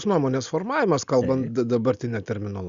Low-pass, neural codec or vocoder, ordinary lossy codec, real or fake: 7.2 kHz; none; Opus, 64 kbps; real